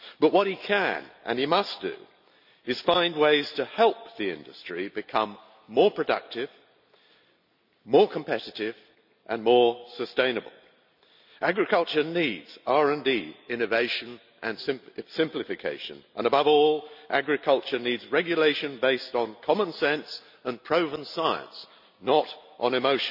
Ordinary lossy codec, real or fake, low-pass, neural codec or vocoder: none; real; 5.4 kHz; none